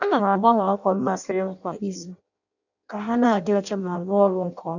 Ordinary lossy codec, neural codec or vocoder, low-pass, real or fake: none; codec, 16 kHz in and 24 kHz out, 0.6 kbps, FireRedTTS-2 codec; 7.2 kHz; fake